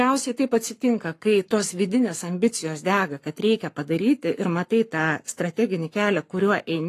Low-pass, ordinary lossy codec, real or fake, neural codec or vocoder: 14.4 kHz; AAC, 48 kbps; fake; codec, 44.1 kHz, 7.8 kbps, Pupu-Codec